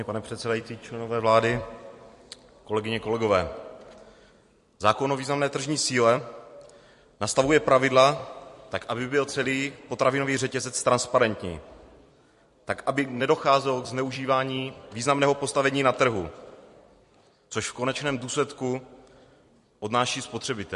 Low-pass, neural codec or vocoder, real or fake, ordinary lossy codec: 14.4 kHz; vocoder, 44.1 kHz, 128 mel bands every 256 samples, BigVGAN v2; fake; MP3, 48 kbps